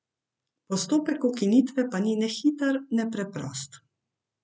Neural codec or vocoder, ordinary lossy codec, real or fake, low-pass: none; none; real; none